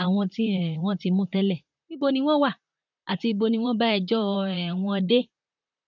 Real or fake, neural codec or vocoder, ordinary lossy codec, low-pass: fake; vocoder, 44.1 kHz, 128 mel bands, Pupu-Vocoder; none; 7.2 kHz